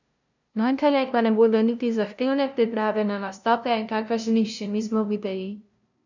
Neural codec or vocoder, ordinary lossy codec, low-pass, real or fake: codec, 16 kHz, 0.5 kbps, FunCodec, trained on LibriTTS, 25 frames a second; none; 7.2 kHz; fake